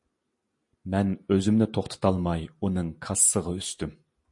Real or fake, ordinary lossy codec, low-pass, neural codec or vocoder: real; MP3, 48 kbps; 10.8 kHz; none